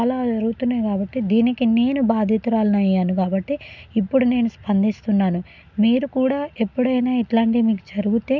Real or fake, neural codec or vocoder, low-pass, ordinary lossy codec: real; none; 7.2 kHz; none